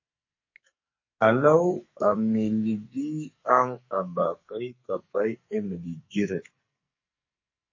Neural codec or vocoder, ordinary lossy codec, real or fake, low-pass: codec, 44.1 kHz, 2.6 kbps, SNAC; MP3, 32 kbps; fake; 7.2 kHz